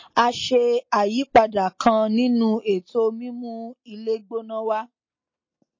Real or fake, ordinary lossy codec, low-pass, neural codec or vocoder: real; MP3, 32 kbps; 7.2 kHz; none